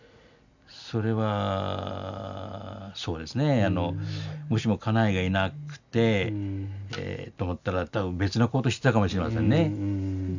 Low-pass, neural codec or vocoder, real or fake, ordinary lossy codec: 7.2 kHz; none; real; none